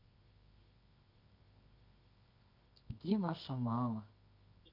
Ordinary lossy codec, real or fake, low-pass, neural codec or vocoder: none; fake; 5.4 kHz; codec, 24 kHz, 0.9 kbps, WavTokenizer, medium music audio release